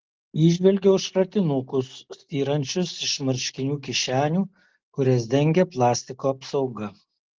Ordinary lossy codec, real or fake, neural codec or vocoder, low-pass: Opus, 16 kbps; real; none; 7.2 kHz